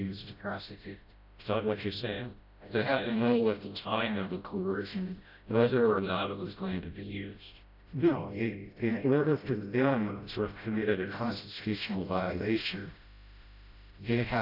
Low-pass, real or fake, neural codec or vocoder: 5.4 kHz; fake; codec, 16 kHz, 0.5 kbps, FreqCodec, smaller model